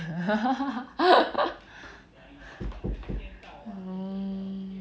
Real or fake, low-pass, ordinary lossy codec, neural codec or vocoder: real; none; none; none